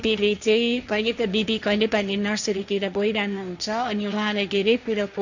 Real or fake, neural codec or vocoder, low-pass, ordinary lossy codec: fake; codec, 16 kHz, 1.1 kbps, Voila-Tokenizer; 7.2 kHz; none